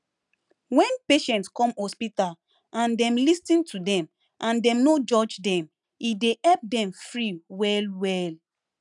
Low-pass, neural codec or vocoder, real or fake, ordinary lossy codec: 10.8 kHz; none; real; none